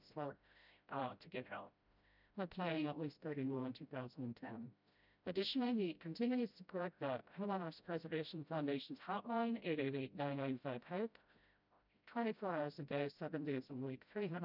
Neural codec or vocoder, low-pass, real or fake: codec, 16 kHz, 0.5 kbps, FreqCodec, smaller model; 5.4 kHz; fake